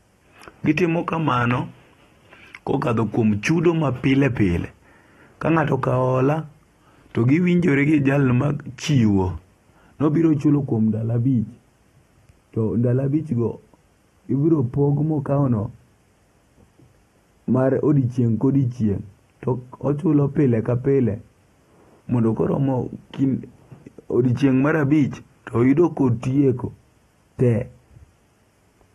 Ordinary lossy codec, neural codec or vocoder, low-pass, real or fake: AAC, 32 kbps; none; 19.8 kHz; real